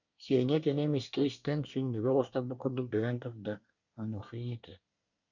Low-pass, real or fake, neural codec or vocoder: 7.2 kHz; fake; codec, 24 kHz, 1 kbps, SNAC